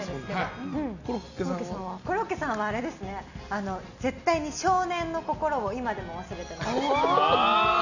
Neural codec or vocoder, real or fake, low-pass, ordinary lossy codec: none; real; 7.2 kHz; none